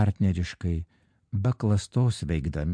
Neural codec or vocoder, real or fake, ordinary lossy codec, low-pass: none; real; MP3, 64 kbps; 9.9 kHz